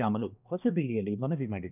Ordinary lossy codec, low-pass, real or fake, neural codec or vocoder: none; 3.6 kHz; fake; codec, 16 kHz, 1 kbps, X-Codec, HuBERT features, trained on balanced general audio